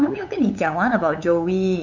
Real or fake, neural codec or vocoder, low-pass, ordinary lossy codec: fake; codec, 16 kHz, 8 kbps, FunCodec, trained on LibriTTS, 25 frames a second; 7.2 kHz; none